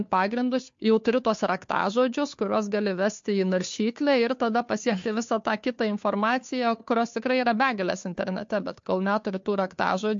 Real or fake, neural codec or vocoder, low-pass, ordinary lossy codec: fake; codec, 16 kHz, 2 kbps, FunCodec, trained on Chinese and English, 25 frames a second; 7.2 kHz; MP3, 48 kbps